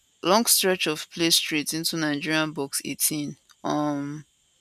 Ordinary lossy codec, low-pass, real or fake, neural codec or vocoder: none; 14.4 kHz; real; none